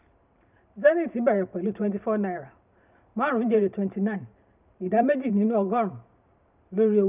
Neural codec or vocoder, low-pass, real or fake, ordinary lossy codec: vocoder, 44.1 kHz, 128 mel bands every 512 samples, BigVGAN v2; 3.6 kHz; fake; none